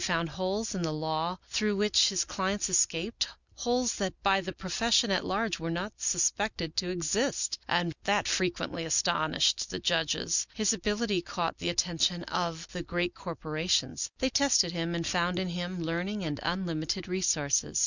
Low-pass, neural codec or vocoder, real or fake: 7.2 kHz; none; real